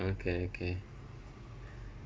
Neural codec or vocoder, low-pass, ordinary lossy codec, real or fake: none; none; none; real